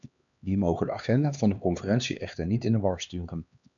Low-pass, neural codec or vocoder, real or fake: 7.2 kHz; codec, 16 kHz, 2 kbps, X-Codec, HuBERT features, trained on LibriSpeech; fake